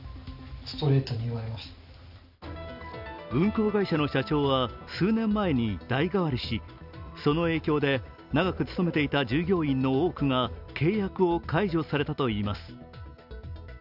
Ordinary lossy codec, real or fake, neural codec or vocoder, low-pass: none; real; none; 5.4 kHz